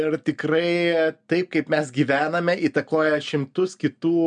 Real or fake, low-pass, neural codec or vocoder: real; 9.9 kHz; none